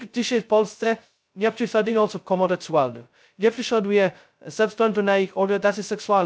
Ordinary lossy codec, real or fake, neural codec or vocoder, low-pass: none; fake; codec, 16 kHz, 0.2 kbps, FocalCodec; none